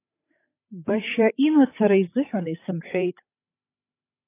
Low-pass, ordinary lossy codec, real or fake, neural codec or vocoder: 3.6 kHz; AAC, 24 kbps; fake; codec, 16 kHz, 16 kbps, FreqCodec, larger model